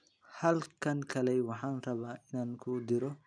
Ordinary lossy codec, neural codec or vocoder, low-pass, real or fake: none; none; 9.9 kHz; real